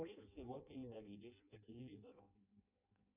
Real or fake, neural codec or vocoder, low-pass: fake; codec, 16 kHz in and 24 kHz out, 0.6 kbps, FireRedTTS-2 codec; 3.6 kHz